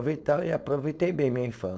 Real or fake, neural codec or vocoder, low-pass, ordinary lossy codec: fake; codec, 16 kHz, 4.8 kbps, FACodec; none; none